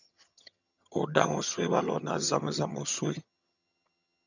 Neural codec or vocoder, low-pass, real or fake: vocoder, 22.05 kHz, 80 mel bands, HiFi-GAN; 7.2 kHz; fake